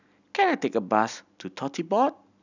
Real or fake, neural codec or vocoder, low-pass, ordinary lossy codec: real; none; 7.2 kHz; none